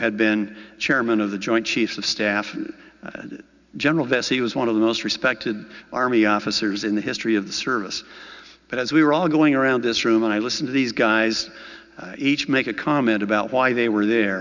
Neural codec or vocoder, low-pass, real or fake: none; 7.2 kHz; real